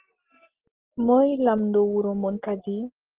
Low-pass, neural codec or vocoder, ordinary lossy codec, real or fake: 3.6 kHz; none; Opus, 16 kbps; real